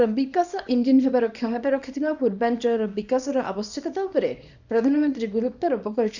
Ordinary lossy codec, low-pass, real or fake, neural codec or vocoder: none; 7.2 kHz; fake; codec, 24 kHz, 0.9 kbps, WavTokenizer, small release